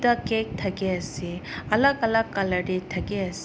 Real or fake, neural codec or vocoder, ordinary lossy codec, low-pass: real; none; none; none